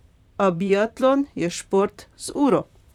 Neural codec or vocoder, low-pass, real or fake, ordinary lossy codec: vocoder, 44.1 kHz, 128 mel bands, Pupu-Vocoder; 19.8 kHz; fake; none